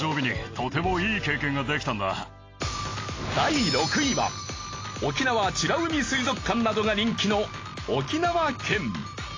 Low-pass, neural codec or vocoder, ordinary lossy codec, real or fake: 7.2 kHz; none; AAC, 32 kbps; real